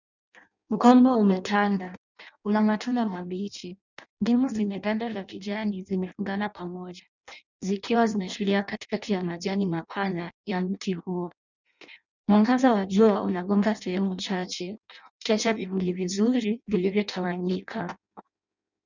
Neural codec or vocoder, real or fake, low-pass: codec, 16 kHz in and 24 kHz out, 0.6 kbps, FireRedTTS-2 codec; fake; 7.2 kHz